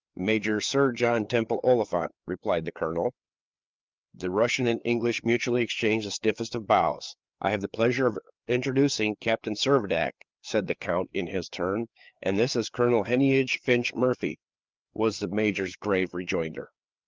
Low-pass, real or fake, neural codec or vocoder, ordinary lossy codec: 7.2 kHz; fake; codec, 16 kHz, 4 kbps, FreqCodec, larger model; Opus, 24 kbps